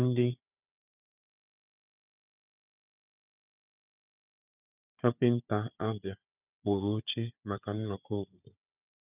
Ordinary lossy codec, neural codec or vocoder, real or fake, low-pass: none; codec, 16 kHz, 4 kbps, FunCodec, trained on Chinese and English, 50 frames a second; fake; 3.6 kHz